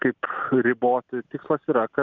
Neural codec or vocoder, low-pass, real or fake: none; 7.2 kHz; real